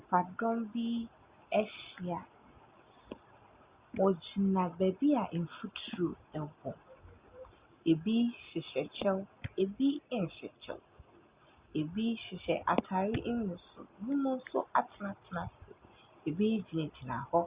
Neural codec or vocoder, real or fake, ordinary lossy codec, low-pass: none; real; Opus, 64 kbps; 3.6 kHz